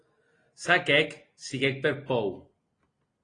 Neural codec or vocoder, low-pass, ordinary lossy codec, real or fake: none; 9.9 kHz; AAC, 32 kbps; real